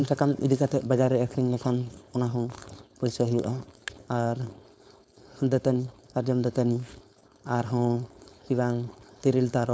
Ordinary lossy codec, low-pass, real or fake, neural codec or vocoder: none; none; fake; codec, 16 kHz, 4.8 kbps, FACodec